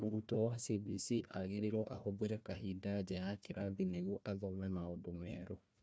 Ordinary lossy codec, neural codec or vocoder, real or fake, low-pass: none; codec, 16 kHz, 1 kbps, FunCodec, trained on Chinese and English, 50 frames a second; fake; none